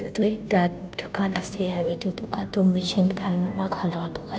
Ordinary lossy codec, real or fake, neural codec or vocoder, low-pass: none; fake; codec, 16 kHz, 0.5 kbps, FunCodec, trained on Chinese and English, 25 frames a second; none